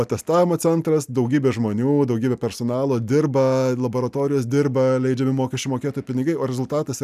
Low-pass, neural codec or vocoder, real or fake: 14.4 kHz; none; real